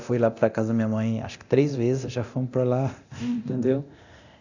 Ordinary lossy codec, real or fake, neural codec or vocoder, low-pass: none; fake; codec, 24 kHz, 0.9 kbps, DualCodec; 7.2 kHz